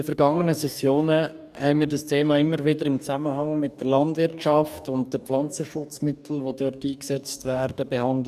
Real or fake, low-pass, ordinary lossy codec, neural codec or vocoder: fake; 14.4 kHz; none; codec, 44.1 kHz, 2.6 kbps, DAC